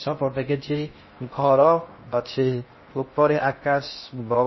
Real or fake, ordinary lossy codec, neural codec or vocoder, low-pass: fake; MP3, 24 kbps; codec, 16 kHz in and 24 kHz out, 0.6 kbps, FocalCodec, streaming, 4096 codes; 7.2 kHz